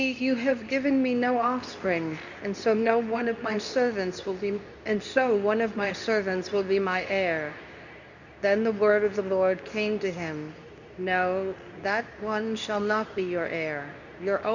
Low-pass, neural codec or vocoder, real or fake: 7.2 kHz; codec, 24 kHz, 0.9 kbps, WavTokenizer, medium speech release version 2; fake